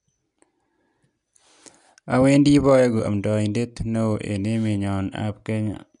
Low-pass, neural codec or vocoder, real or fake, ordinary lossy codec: 10.8 kHz; none; real; none